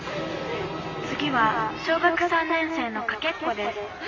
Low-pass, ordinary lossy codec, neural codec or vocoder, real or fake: 7.2 kHz; MP3, 48 kbps; none; real